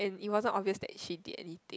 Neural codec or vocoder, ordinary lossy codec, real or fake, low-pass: none; none; real; none